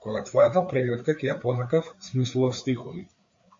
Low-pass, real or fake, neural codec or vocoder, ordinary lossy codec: 7.2 kHz; fake; codec, 16 kHz, 4 kbps, FreqCodec, larger model; MP3, 48 kbps